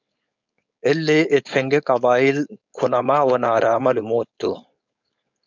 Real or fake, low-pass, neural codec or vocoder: fake; 7.2 kHz; codec, 16 kHz, 4.8 kbps, FACodec